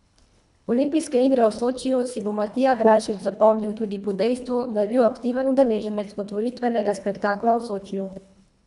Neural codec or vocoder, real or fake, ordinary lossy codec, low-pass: codec, 24 kHz, 1.5 kbps, HILCodec; fake; none; 10.8 kHz